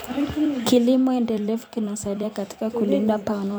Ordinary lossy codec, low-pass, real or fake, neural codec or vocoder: none; none; fake; vocoder, 44.1 kHz, 128 mel bands every 256 samples, BigVGAN v2